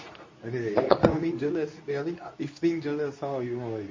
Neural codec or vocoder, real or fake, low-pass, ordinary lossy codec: codec, 24 kHz, 0.9 kbps, WavTokenizer, medium speech release version 2; fake; 7.2 kHz; MP3, 32 kbps